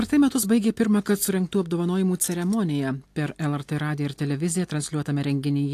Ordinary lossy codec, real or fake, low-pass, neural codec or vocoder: AAC, 64 kbps; real; 14.4 kHz; none